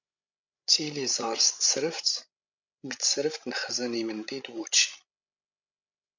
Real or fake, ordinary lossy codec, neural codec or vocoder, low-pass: fake; MP3, 64 kbps; codec, 16 kHz, 16 kbps, FreqCodec, larger model; 7.2 kHz